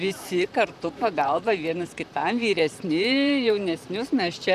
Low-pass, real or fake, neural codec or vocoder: 14.4 kHz; fake; vocoder, 44.1 kHz, 128 mel bands, Pupu-Vocoder